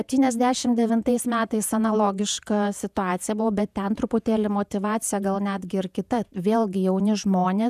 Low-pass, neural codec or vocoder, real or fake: 14.4 kHz; vocoder, 48 kHz, 128 mel bands, Vocos; fake